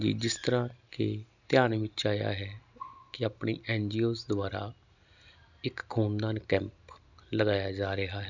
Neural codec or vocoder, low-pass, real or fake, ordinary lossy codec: none; 7.2 kHz; real; none